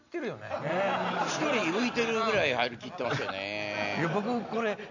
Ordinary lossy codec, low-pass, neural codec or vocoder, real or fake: none; 7.2 kHz; none; real